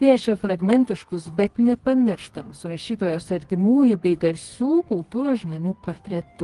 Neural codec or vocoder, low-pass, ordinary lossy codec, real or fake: codec, 24 kHz, 0.9 kbps, WavTokenizer, medium music audio release; 10.8 kHz; Opus, 24 kbps; fake